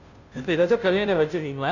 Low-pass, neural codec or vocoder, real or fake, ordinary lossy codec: 7.2 kHz; codec, 16 kHz, 0.5 kbps, FunCodec, trained on Chinese and English, 25 frames a second; fake; none